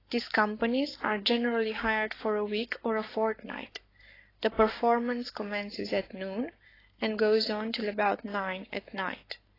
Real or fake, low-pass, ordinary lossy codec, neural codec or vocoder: fake; 5.4 kHz; AAC, 24 kbps; codec, 44.1 kHz, 7.8 kbps, Pupu-Codec